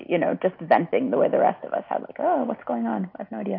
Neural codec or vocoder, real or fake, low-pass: none; real; 5.4 kHz